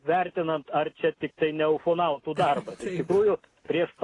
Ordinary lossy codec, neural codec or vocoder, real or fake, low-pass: AAC, 32 kbps; none; real; 10.8 kHz